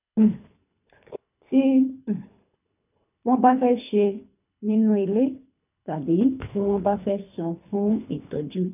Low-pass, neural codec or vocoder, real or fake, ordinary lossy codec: 3.6 kHz; codec, 24 kHz, 3 kbps, HILCodec; fake; none